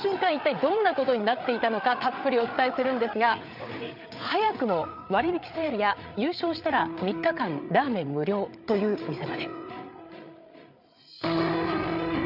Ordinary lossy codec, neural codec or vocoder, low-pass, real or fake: none; codec, 16 kHz, 8 kbps, FreqCodec, larger model; 5.4 kHz; fake